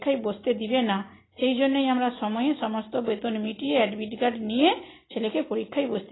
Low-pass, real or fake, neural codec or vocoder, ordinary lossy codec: 7.2 kHz; real; none; AAC, 16 kbps